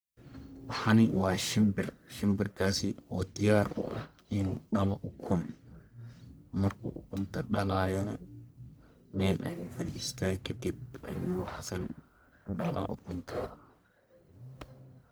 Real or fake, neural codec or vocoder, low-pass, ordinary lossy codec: fake; codec, 44.1 kHz, 1.7 kbps, Pupu-Codec; none; none